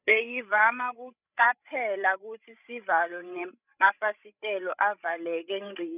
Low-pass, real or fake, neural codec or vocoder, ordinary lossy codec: 3.6 kHz; fake; codec, 16 kHz, 16 kbps, FreqCodec, larger model; none